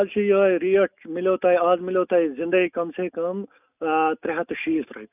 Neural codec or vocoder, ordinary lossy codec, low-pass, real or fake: none; none; 3.6 kHz; real